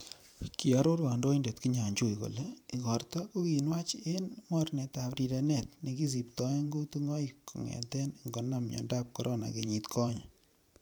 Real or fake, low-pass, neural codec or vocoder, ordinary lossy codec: fake; none; vocoder, 44.1 kHz, 128 mel bands every 512 samples, BigVGAN v2; none